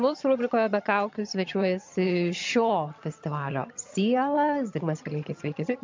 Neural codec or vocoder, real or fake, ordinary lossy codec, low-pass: vocoder, 22.05 kHz, 80 mel bands, HiFi-GAN; fake; MP3, 64 kbps; 7.2 kHz